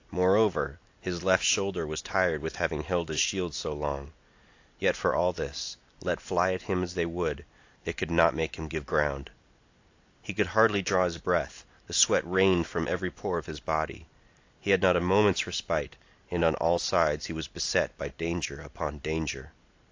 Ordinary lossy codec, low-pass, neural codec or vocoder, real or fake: AAC, 48 kbps; 7.2 kHz; none; real